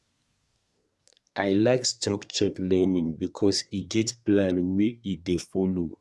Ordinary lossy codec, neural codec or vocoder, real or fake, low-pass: none; codec, 24 kHz, 1 kbps, SNAC; fake; none